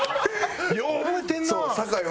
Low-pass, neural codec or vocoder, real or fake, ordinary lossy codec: none; none; real; none